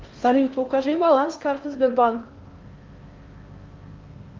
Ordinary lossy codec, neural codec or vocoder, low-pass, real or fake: Opus, 24 kbps; codec, 16 kHz in and 24 kHz out, 0.6 kbps, FocalCodec, streaming, 4096 codes; 7.2 kHz; fake